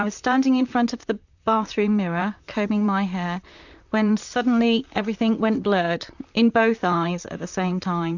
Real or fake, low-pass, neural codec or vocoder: fake; 7.2 kHz; vocoder, 44.1 kHz, 128 mel bands, Pupu-Vocoder